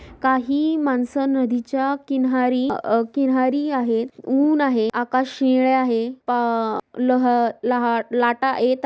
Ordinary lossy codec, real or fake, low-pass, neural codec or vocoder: none; real; none; none